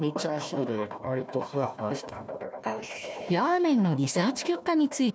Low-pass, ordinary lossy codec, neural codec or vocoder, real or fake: none; none; codec, 16 kHz, 1 kbps, FunCodec, trained on Chinese and English, 50 frames a second; fake